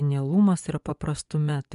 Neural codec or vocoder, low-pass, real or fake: vocoder, 24 kHz, 100 mel bands, Vocos; 10.8 kHz; fake